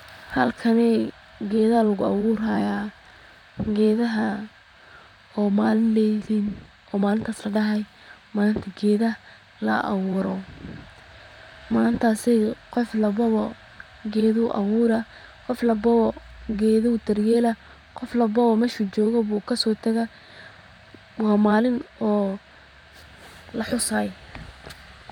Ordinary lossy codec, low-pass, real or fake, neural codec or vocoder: none; 19.8 kHz; fake; vocoder, 44.1 kHz, 128 mel bands, Pupu-Vocoder